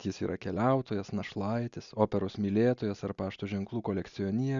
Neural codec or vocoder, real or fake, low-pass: none; real; 7.2 kHz